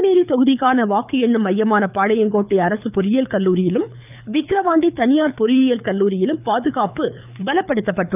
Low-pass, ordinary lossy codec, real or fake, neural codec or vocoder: 3.6 kHz; none; fake; codec, 24 kHz, 6 kbps, HILCodec